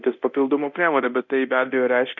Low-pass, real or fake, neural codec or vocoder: 7.2 kHz; fake; codec, 24 kHz, 0.9 kbps, DualCodec